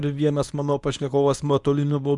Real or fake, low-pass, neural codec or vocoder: fake; 10.8 kHz; codec, 24 kHz, 0.9 kbps, WavTokenizer, medium speech release version 1